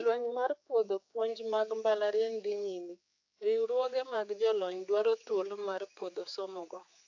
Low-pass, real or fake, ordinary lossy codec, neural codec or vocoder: 7.2 kHz; fake; AAC, 48 kbps; codec, 16 kHz, 4 kbps, X-Codec, HuBERT features, trained on general audio